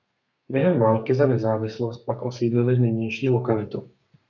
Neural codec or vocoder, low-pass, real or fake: codec, 32 kHz, 1.9 kbps, SNAC; 7.2 kHz; fake